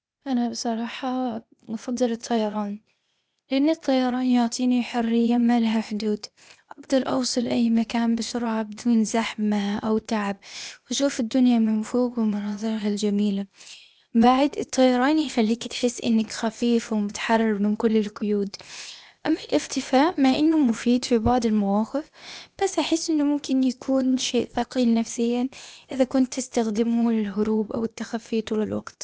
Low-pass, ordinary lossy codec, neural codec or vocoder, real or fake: none; none; codec, 16 kHz, 0.8 kbps, ZipCodec; fake